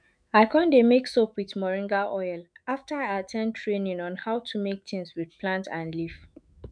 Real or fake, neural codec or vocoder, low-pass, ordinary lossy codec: fake; autoencoder, 48 kHz, 128 numbers a frame, DAC-VAE, trained on Japanese speech; 9.9 kHz; none